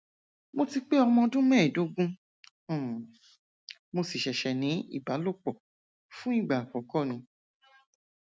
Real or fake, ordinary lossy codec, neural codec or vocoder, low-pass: real; none; none; none